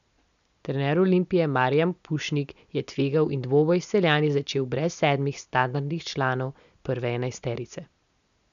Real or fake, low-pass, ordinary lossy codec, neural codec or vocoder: real; 7.2 kHz; none; none